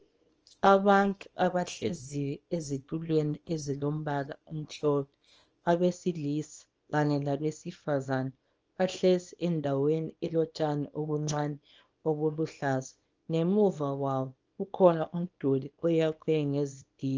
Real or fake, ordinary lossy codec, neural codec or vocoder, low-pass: fake; Opus, 24 kbps; codec, 24 kHz, 0.9 kbps, WavTokenizer, small release; 7.2 kHz